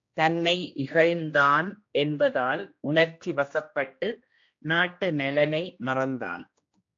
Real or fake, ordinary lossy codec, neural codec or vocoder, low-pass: fake; AAC, 48 kbps; codec, 16 kHz, 1 kbps, X-Codec, HuBERT features, trained on general audio; 7.2 kHz